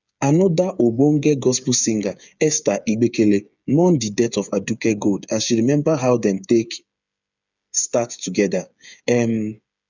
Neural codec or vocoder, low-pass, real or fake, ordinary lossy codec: codec, 16 kHz, 8 kbps, FreqCodec, smaller model; 7.2 kHz; fake; none